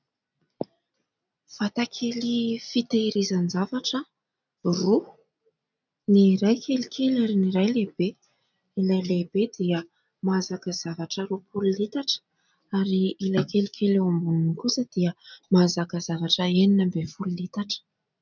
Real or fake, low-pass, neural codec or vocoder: real; 7.2 kHz; none